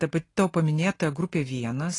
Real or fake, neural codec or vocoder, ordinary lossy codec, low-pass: real; none; AAC, 32 kbps; 10.8 kHz